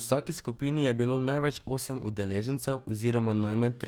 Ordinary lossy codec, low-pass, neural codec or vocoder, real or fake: none; none; codec, 44.1 kHz, 2.6 kbps, DAC; fake